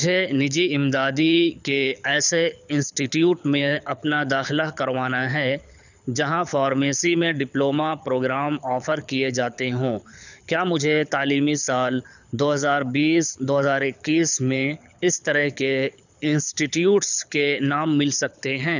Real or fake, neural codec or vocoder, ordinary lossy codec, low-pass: fake; codec, 24 kHz, 6 kbps, HILCodec; none; 7.2 kHz